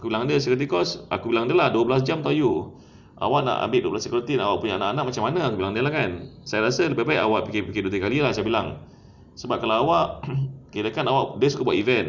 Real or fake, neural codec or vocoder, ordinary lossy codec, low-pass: real; none; none; 7.2 kHz